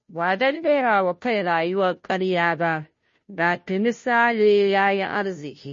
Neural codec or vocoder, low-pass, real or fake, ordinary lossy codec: codec, 16 kHz, 0.5 kbps, FunCodec, trained on Chinese and English, 25 frames a second; 7.2 kHz; fake; MP3, 32 kbps